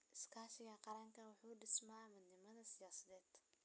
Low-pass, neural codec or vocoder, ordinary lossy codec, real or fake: none; none; none; real